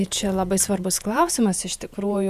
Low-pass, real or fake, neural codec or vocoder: 14.4 kHz; fake; vocoder, 48 kHz, 128 mel bands, Vocos